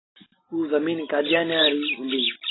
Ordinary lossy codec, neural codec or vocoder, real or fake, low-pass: AAC, 16 kbps; none; real; 7.2 kHz